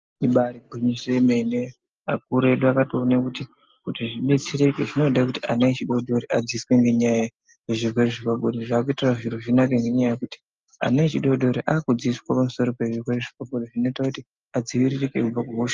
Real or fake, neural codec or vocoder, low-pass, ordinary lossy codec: real; none; 7.2 kHz; Opus, 24 kbps